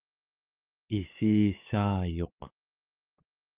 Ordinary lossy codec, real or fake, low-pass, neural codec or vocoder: Opus, 32 kbps; fake; 3.6 kHz; codec, 44.1 kHz, 7.8 kbps, DAC